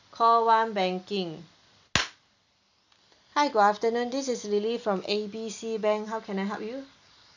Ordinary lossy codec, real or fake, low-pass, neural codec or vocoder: none; real; 7.2 kHz; none